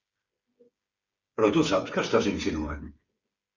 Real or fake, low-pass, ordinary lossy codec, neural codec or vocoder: fake; 7.2 kHz; Opus, 64 kbps; codec, 16 kHz, 8 kbps, FreqCodec, smaller model